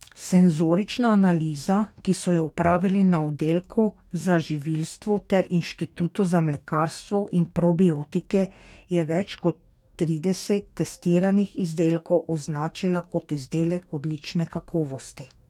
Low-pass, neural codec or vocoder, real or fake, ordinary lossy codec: 19.8 kHz; codec, 44.1 kHz, 2.6 kbps, DAC; fake; none